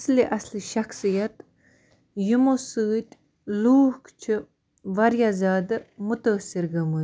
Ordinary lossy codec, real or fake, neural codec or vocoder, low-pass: none; real; none; none